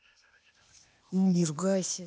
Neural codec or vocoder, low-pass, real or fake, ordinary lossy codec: codec, 16 kHz, 0.8 kbps, ZipCodec; none; fake; none